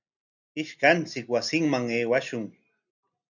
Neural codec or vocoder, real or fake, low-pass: none; real; 7.2 kHz